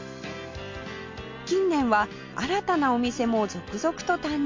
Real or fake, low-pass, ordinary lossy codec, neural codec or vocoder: real; 7.2 kHz; MP3, 48 kbps; none